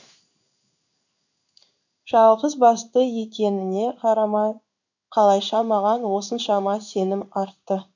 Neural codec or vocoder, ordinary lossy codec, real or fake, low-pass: codec, 16 kHz in and 24 kHz out, 1 kbps, XY-Tokenizer; none; fake; 7.2 kHz